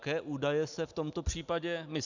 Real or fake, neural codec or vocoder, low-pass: real; none; 7.2 kHz